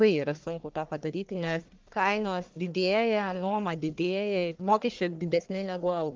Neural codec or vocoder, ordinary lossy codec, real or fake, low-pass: codec, 44.1 kHz, 1.7 kbps, Pupu-Codec; Opus, 32 kbps; fake; 7.2 kHz